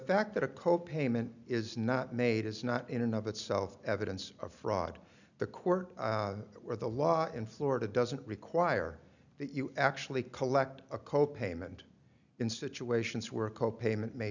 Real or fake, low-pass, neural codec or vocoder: real; 7.2 kHz; none